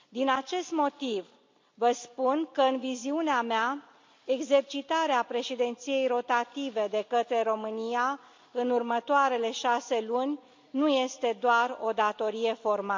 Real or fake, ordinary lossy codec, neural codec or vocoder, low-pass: real; MP3, 48 kbps; none; 7.2 kHz